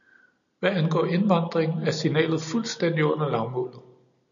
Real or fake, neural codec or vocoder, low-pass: real; none; 7.2 kHz